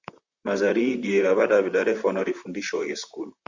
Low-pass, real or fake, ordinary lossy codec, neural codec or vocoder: 7.2 kHz; fake; Opus, 64 kbps; vocoder, 44.1 kHz, 128 mel bands, Pupu-Vocoder